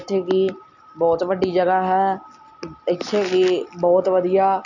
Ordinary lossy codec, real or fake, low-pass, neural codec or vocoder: none; real; 7.2 kHz; none